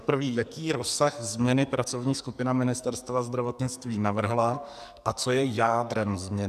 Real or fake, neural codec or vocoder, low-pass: fake; codec, 44.1 kHz, 2.6 kbps, SNAC; 14.4 kHz